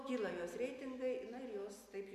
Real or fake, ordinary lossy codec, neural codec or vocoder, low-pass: real; MP3, 96 kbps; none; 14.4 kHz